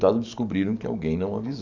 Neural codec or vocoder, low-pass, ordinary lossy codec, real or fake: codec, 44.1 kHz, 7.8 kbps, DAC; 7.2 kHz; none; fake